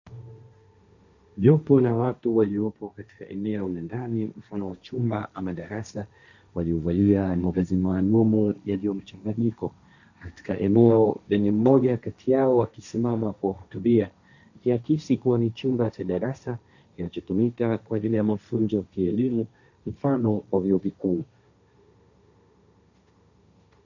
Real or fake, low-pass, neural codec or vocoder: fake; 7.2 kHz; codec, 16 kHz, 1.1 kbps, Voila-Tokenizer